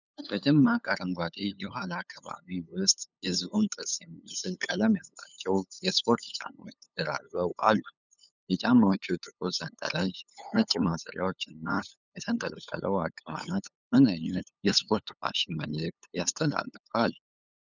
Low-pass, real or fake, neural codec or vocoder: 7.2 kHz; fake; codec, 16 kHz, 8 kbps, FunCodec, trained on LibriTTS, 25 frames a second